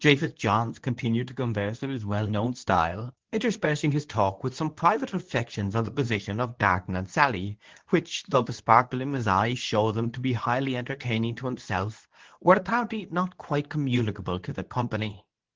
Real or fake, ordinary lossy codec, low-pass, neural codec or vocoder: fake; Opus, 16 kbps; 7.2 kHz; codec, 24 kHz, 0.9 kbps, WavTokenizer, medium speech release version 2